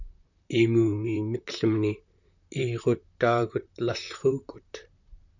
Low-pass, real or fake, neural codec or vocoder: 7.2 kHz; fake; vocoder, 44.1 kHz, 128 mel bands, Pupu-Vocoder